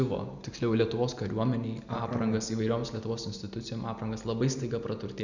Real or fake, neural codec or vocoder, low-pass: real; none; 7.2 kHz